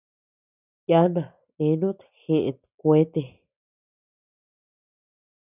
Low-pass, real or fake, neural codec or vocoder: 3.6 kHz; real; none